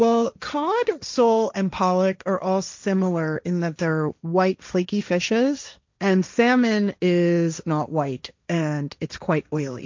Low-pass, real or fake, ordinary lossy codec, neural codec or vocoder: 7.2 kHz; fake; MP3, 64 kbps; codec, 16 kHz, 1.1 kbps, Voila-Tokenizer